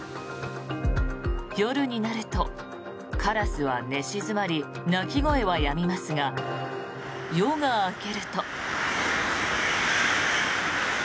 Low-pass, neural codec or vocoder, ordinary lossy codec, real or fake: none; none; none; real